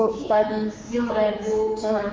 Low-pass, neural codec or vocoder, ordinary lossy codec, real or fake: none; codec, 16 kHz, 4 kbps, X-Codec, HuBERT features, trained on general audio; none; fake